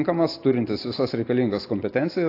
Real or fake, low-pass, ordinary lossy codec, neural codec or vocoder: real; 5.4 kHz; AAC, 32 kbps; none